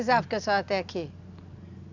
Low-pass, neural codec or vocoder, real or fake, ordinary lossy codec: 7.2 kHz; none; real; none